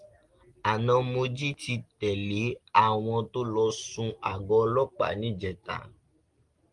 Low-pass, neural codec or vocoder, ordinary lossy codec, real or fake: 10.8 kHz; none; Opus, 24 kbps; real